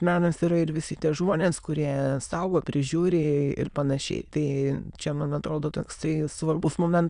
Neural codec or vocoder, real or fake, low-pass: autoencoder, 22.05 kHz, a latent of 192 numbers a frame, VITS, trained on many speakers; fake; 9.9 kHz